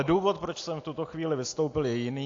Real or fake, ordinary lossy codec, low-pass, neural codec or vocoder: real; AAC, 48 kbps; 7.2 kHz; none